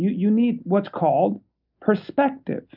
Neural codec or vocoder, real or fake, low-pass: none; real; 5.4 kHz